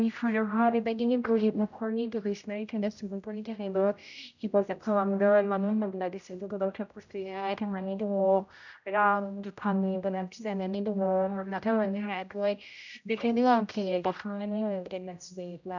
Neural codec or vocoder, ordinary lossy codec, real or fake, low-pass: codec, 16 kHz, 0.5 kbps, X-Codec, HuBERT features, trained on general audio; none; fake; 7.2 kHz